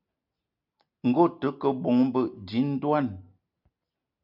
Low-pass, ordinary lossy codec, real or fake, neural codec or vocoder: 5.4 kHz; MP3, 48 kbps; real; none